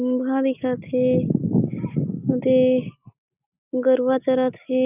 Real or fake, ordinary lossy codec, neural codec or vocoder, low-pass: real; none; none; 3.6 kHz